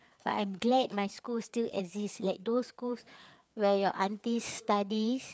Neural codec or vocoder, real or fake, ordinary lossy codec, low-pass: codec, 16 kHz, 4 kbps, FreqCodec, larger model; fake; none; none